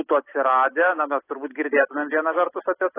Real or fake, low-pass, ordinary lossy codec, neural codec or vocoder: real; 3.6 kHz; AAC, 16 kbps; none